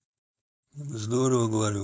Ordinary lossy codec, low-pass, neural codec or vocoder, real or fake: none; none; codec, 16 kHz, 4.8 kbps, FACodec; fake